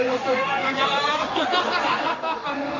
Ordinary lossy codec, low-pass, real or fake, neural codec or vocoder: none; 7.2 kHz; fake; codec, 16 kHz in and 24 kHz out, 1 kbps, XY-Tokenizer